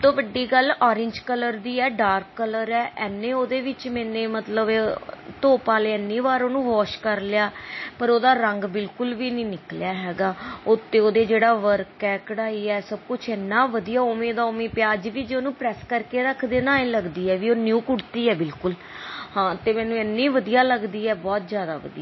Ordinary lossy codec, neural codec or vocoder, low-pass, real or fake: MP3, 24 kbps; none; 7.2 kHz; real